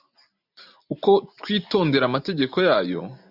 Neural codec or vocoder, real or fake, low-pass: none; real; 5.4 kHz